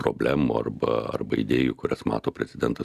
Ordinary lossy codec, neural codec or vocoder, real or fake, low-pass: Opus, 64 kbps; none; real; 14.4 kHz